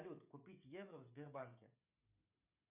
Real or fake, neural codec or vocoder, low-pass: fake; codec, 16 kHz, 16 kbps, FreqCodec, smaller model; 3.6 kHz